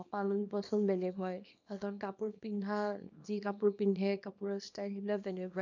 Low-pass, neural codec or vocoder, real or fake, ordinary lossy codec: 7.2 kHz; codec, 24 kHz, 0.9 kbps, WavTokenizer, small release; fake; none